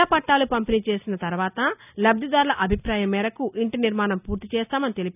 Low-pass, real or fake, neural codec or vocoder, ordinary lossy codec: 3.6 kHz; real; none; none